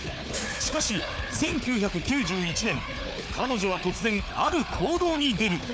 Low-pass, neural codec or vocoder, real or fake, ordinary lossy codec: none; codec, 16 kHz, 4 kbps, FunCodec, trained on Chinese and English, 50 frames a second; fake; none